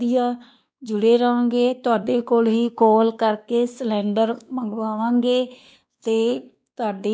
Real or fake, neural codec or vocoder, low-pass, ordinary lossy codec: fake; codec, 16 kHz, 2 kbps, X-Codec, WavLM features, trained on Multilingual LibriSpeech; none; none